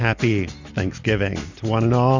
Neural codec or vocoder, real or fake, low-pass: none; real; 7.2 kHz